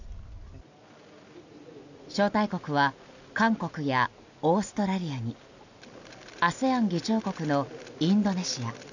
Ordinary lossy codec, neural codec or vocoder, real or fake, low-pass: none; none; real; 7.2 kHz